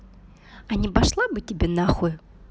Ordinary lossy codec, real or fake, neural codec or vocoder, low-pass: none; real; none; none